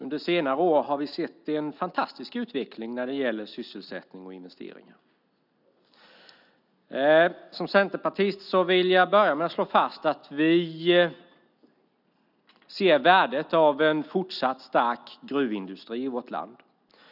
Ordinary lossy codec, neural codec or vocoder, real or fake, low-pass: none; none; real; 5.4 kHz